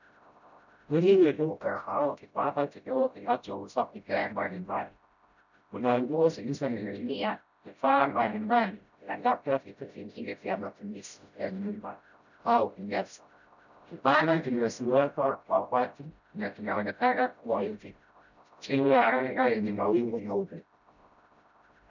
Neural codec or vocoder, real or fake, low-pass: codec, 16 kHz, 0.5 kbps, FreqCodec, smaller model; fake; 7.2 kHz